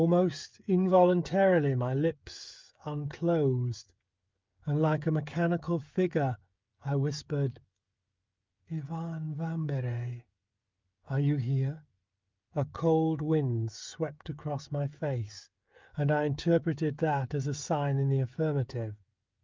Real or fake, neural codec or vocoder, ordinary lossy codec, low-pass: fake; codec, 16 kHz, 16 kbps, FreqCodec, smaller model; Opus, 24 kbps; 7.2 kHz